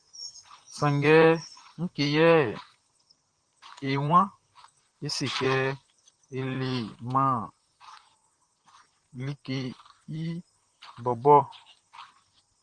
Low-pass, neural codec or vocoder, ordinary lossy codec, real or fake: 9.9 kHz; vocoder, 44.1 kHz, 128 mel bands, Pupu-Vocoder; Opus, 24 kbps; fake